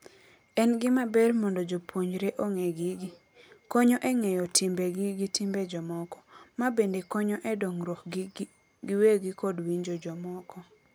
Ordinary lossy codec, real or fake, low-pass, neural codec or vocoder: none; real; none; none